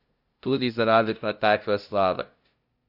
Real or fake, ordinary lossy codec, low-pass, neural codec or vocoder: fake; Opus, 64 kbps; 5.4 kHz; codec, 16 kHz, 0.5 kbps, FunCodec, trained on LibriTTS, 25 frames a second